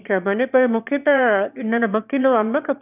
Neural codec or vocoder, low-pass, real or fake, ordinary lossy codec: autoencoder, 22.05 kHz, a latent of 192 numbers a frame, VITS, trained on one speaker; 3.6 kHz; fake; none